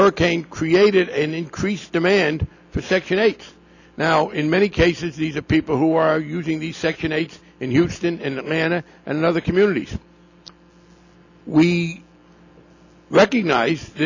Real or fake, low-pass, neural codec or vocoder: real; 7.2 kHz; none